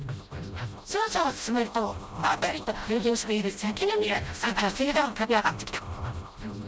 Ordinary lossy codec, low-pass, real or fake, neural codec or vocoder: none; none; fake; codec, 16 kHz, 0.5 kbps, FreqCodec, smaller model